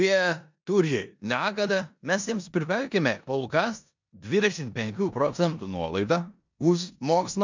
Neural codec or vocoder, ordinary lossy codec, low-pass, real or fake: codec, 16 kHz in and 24 kHz out, 0.9 kbps, LongCat-Audio-Codec, four codebook decoder; MP3, 64 kbps; 7.2 kHz; fake